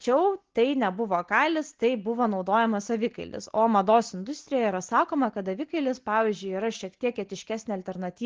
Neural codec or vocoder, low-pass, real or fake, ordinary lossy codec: none; 7.2 kHz; real; Opus, 16 kbps